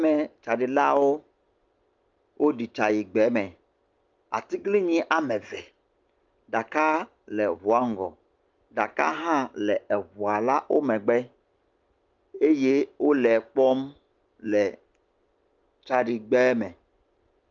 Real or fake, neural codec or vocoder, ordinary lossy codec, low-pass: real; none; Opus, 24 kbps; 7.2 kHz